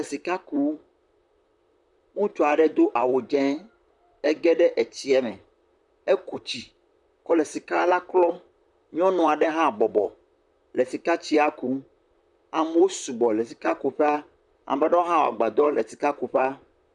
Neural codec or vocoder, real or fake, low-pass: vocoder, 44.1 kHz, 128 mel bands, Pupu-Vocoder; fake; 10.8 kHz